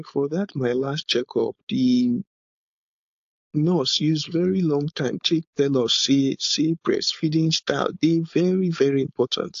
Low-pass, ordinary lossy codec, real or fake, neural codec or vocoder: 7.2 kHz; AAC, 48 kbps; fake; codec, 16 kHz, 4.8 kbps, FACodec